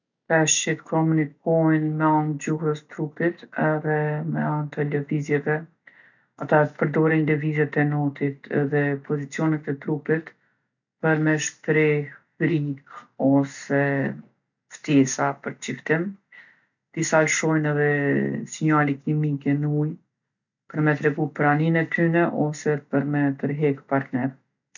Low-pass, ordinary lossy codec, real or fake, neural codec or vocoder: 7.2 kHz; none; real; none